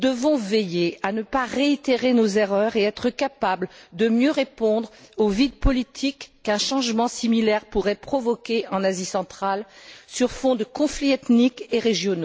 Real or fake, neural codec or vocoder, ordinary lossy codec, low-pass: real; none; none; none